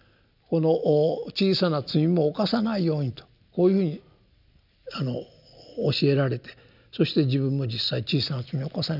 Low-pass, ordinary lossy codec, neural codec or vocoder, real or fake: 5.4 kHz; none; none; real